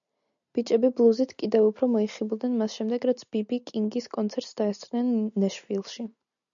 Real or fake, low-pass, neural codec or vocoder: real; 7.2 kHz; none